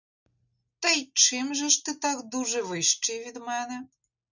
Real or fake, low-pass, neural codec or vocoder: real; 7.2 kHz; none